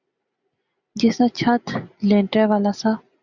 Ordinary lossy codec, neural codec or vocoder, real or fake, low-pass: Opus, 64 kbps; none; real; 7.2 kHz